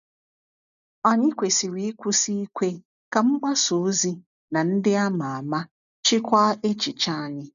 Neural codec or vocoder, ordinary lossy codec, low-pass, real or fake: none; none; 7.2 kHz; real